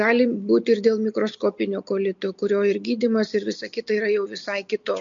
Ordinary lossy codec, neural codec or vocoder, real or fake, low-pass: MP3, 48 kbps; none; real; 7.2 kHz